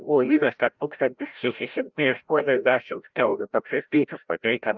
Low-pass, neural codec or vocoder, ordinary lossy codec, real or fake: 7.2 kHz; codec, 16 kHz, 0.5 kbps, FreqCodec, larger model; Opus, 24 kbps; fake